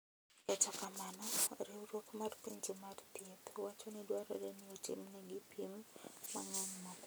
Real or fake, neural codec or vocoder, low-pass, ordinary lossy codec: real; none; none; none